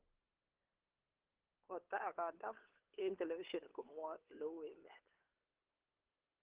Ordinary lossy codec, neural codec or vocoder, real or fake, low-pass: Opus, 16 kbps; codec, 16 kHz, 8 kbps, FunCodec, trained on LibriTTS, 25 frames a second; fake; 3.6 kHz